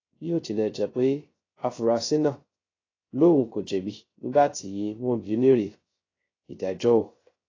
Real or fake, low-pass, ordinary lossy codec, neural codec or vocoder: fake; 7.2 kHz; AAC, 32 kbps; codec, 16 kHz, 0.3 kbps, FocalCodec